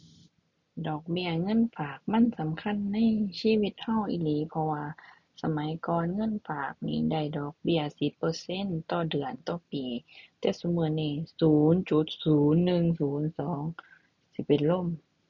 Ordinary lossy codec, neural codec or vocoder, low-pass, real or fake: none; none; 7.2 kHz; real